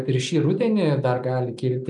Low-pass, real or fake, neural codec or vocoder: 10.8 kHz; real; none